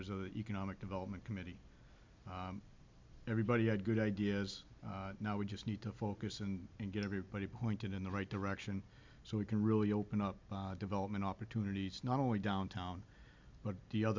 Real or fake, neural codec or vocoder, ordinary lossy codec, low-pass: real; none; MP3, 64 kbps; 7.2 kHz